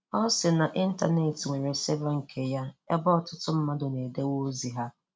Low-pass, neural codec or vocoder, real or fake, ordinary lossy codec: none; none; real; none